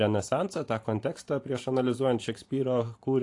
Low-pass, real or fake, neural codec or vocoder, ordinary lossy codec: 10.8 kHz; fake; vocoder, 44.1 kHz, 128 mel bands every 512 samples, BigVGAN v2; AAC, 48 kbps